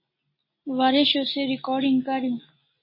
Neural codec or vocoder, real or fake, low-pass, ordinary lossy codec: none; real; 5.4 kHz; MP3, 24 kbps